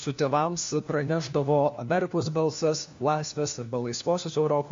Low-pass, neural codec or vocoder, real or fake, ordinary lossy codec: 7.2 kHz; codec, 16 kHz, 1 kbps, FunCodec, trained on LibriTTS, 50 frames a second; fake; MP3, 48 kbps